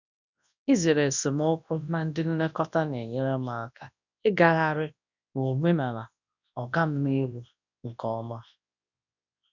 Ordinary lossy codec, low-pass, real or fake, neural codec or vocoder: none; 7.2 kHz; fake; codec, 24 kHz, 0.9 kbps, WavTokenizer, large speech release